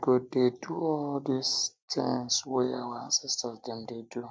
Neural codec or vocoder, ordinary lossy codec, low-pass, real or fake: none; none; none; real